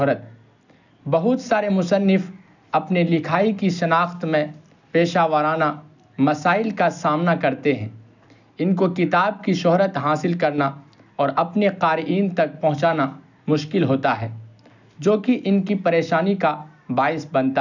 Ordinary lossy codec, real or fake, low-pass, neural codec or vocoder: none; real; 7.2 kHz; none